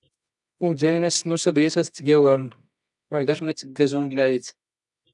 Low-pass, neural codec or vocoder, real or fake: 10.8 kHz; codec, 24 kHz, 0.9 kbps, WavTokenizer, medium music audio release; fake